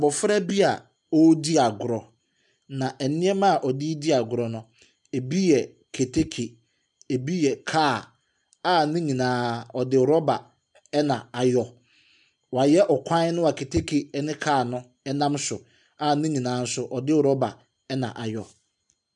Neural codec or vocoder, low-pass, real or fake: none; 10.8 kHz; real